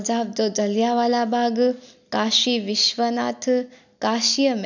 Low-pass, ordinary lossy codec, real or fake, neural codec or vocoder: 7.2 kHz; none; real; none